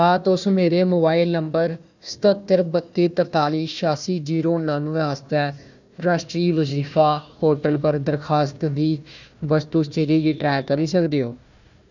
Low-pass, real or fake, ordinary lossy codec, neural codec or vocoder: 7.2 kHz; fake; none; codec, 16 kHz, 1 kbps, FunCodec, trained on Chinese and English, 50 frames a second